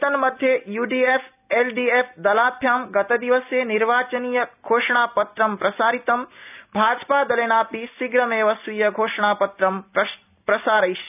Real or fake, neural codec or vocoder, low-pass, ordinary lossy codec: real; none; 3.6 kHz; none